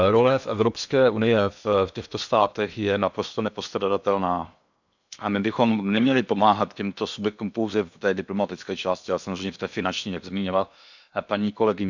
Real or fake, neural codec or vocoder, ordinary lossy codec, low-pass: fake; codec, 16 kHz in and 24 kHz out, 0.8 kbps, FocalCodec, streaming, 65536 codes; none; 7.2 kHz